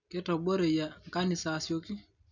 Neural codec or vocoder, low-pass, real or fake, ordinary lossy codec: none; 7.2 kHz; real; none